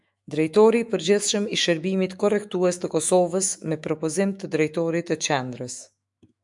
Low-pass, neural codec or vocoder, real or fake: 10.8 kHz; autoencoder, 48 kHz, 128 numbers a frame, DAC-VAE, trained on Japanese speech; fake